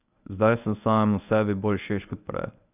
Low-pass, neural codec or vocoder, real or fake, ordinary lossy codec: 3.6 kHz; codec, 24 kHz, 0.9 kbps, WavTokenizer, medium speech release version 1; fake; none